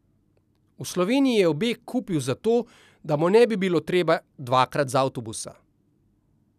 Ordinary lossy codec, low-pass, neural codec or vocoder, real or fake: none; 14.4 kHz; none; real